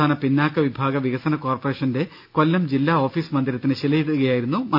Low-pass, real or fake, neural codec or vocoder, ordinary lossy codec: 5.4 kHz; real; none; none